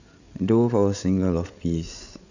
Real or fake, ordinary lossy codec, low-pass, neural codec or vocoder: fake; none; 7.2 kHz; codec, 16 kHz, 8 kbps, FreqCodec, larger model